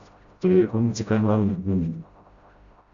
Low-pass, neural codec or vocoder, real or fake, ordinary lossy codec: 7.2 kHz; codec, 16 kHz, 0.5 kbps, FreqCodec, smaller model; fake; Opus, 64 kbps